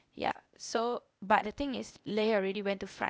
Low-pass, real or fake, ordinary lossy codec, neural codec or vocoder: none; fake; none; codec, 16 kHz, 0.8 kbps, ZipCodec